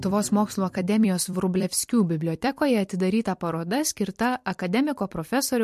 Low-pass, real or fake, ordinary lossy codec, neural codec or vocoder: 14.4 kHz; fake; MP3, 64 kbps; vocoder, 44.1 kHz, 128 mel bands every 256 samples, BigVGAN v2